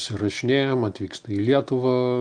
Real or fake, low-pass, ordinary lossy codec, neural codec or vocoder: real; 9.9 kHz; Opus, 32 kbps; none